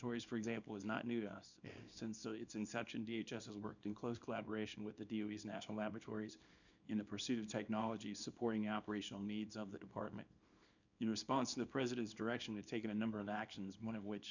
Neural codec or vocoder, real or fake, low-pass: codec, 24 kHz, 0.9 kbps, WavTokenizer, small release; fake; 7.2 kHz